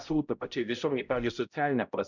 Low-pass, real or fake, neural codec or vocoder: 7.2 kHz; fake; codec, 16 kHz, 0.5 kbps, X-Codec, HuBERT features, trained on balanced general audio